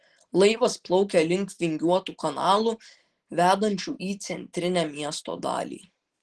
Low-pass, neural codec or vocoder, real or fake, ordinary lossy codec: 10.8 kHz; none; real; Opus, 16 kbps